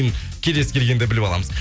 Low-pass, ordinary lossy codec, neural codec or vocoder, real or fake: none; none; none; real